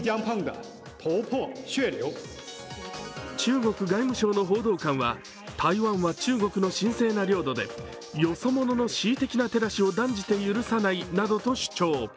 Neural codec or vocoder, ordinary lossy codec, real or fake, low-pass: none; none; real; none